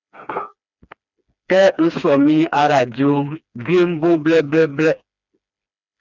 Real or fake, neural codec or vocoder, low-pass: fake; codec, 16 kHz, 2 kbps, FreqCodec, smaller model; 7.2 kHz